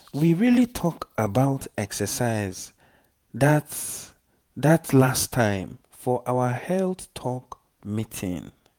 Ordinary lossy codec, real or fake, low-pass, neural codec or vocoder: none; fake; none; vocoder, 48 kHz, 128 mel bands, Vocos